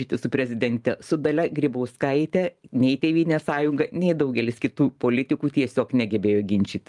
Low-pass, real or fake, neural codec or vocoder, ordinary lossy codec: 10.8 kHz; fake; vocoder, 44.1 kHz, 128 mel bands every 512 samples, BigVGAN v2; Opus, 32 kbps